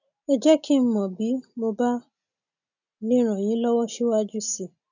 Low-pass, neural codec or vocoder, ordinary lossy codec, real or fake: 7.2 kHz; none; none; real